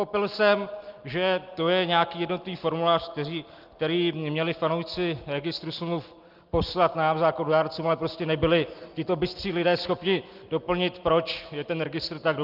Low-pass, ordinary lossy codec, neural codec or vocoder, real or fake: 5.4 kHz; Opus, 32 kbps; none; real